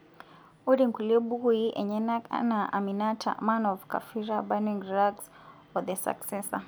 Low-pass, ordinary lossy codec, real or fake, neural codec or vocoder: none; none; real; none